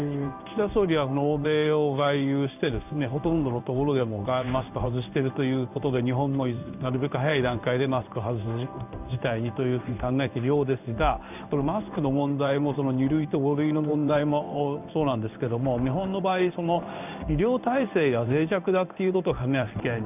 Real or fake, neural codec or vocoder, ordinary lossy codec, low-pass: fake; codec, 16 kHz in and 24 kHz out, 1 kbps, XY-Tokenizer; none; 3.6 kHz